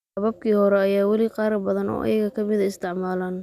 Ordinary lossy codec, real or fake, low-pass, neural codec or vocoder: none; real; 14.4 kHz; none